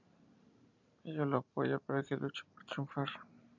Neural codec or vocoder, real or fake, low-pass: vocoder, 44.1 kHz, 128 mel bands every 512 samples, BigVGAN v2; fake; 7.2 kHz